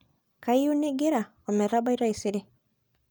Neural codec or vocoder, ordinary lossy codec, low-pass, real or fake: none; none; none; real